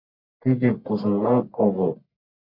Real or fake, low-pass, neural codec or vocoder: fake; 5.4 kHz; codec, 44.1 kHz, 1.7 kbps, Pupu-Codec